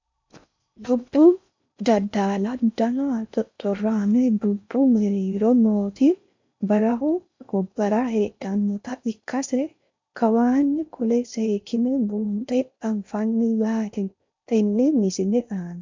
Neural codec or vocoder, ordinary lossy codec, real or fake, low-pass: codec, 16 kHz in and 24 kHz out, 0.6 kbps, FocalCodec, streaming, 4096 codes; MP3, 64 kbps; fake; 7.2 kHz